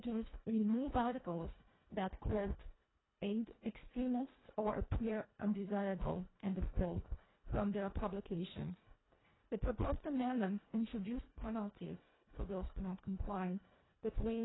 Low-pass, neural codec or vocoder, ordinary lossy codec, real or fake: 7.2 kHz; codec, 24 kHz, 1.5 kbps, HILCodec; AAC, 16 kbps; fake